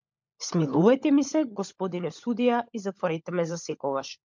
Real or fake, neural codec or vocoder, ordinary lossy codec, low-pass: fake; codec, 16 kHz, 16 kbps, FunCodec, trained on LibriTTS, 50 frames a second; MP3, 64 kbps; 7.2 kHz